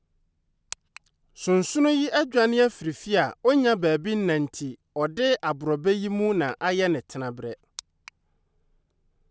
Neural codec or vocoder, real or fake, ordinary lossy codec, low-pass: none; real; none; none